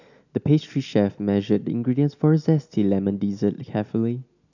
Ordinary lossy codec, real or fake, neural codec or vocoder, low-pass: none; real; none; 7.2 kHz